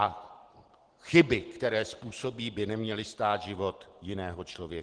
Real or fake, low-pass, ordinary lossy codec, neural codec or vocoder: real; 9.9 kHz; Opus, 16 kbps; none